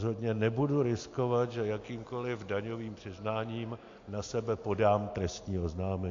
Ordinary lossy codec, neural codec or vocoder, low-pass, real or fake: AAC, 48 kbps; none; 7.2 kHz; real